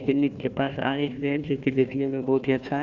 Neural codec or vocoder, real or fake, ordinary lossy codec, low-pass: codec, 16 kHz, 1 kbps, FunCodec, trained on Chinese and English, 50 frames a second; fake; none; 7.2 kHz